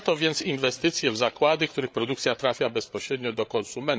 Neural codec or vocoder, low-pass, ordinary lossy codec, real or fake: codec, 16 kHz, 8 kbps, FreqCodec, larger model; none; none; fake